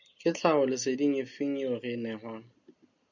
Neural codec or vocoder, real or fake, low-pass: none; real; 7.2 kHz